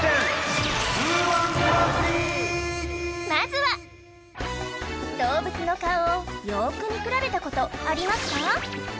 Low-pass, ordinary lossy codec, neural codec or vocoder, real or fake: none; none; none; real